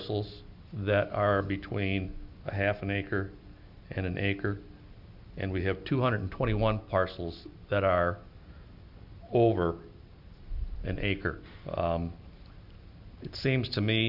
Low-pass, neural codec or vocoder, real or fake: 5.4 kHz; none; real